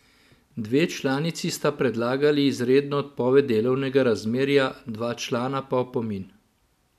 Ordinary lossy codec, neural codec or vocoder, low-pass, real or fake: none; none; 14.4 kHz; real